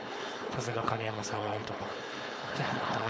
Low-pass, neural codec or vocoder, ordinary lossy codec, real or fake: none; codec, 16 kHz, 4.8 kbps, FACodec; none; fake